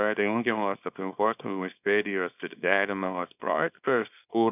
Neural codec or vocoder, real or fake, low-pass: codec, 24 kHz, 0.9 kbps, WavTokenizer, small release; fake; 3.6 kHz